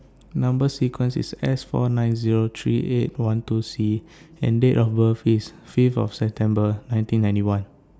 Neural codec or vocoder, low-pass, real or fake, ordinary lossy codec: none; none; real; none